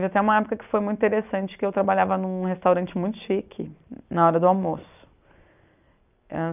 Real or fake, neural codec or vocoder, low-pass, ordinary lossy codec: real; none; 3.6 kHz; none